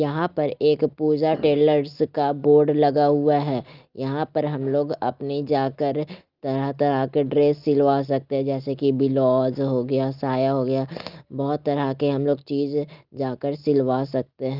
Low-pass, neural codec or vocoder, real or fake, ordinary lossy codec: 5.4 kHz; none; real; Opus, 32 kbps